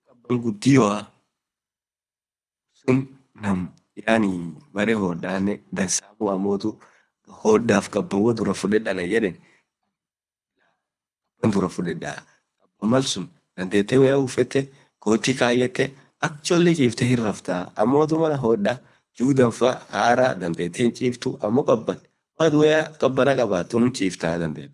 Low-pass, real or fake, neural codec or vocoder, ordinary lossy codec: none; fake; codec, 24 kHz, 3 kbps, HILCodec; none